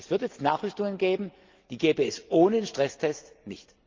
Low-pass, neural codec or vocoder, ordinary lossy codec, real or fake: 7.2 kHz; none; Opus, 24 kbps; real